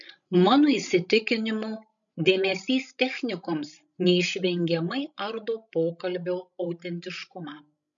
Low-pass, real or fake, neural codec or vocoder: 7.2 kHz; fake; codec, 16 kHz, 16 kbps, FreqCodec, larger model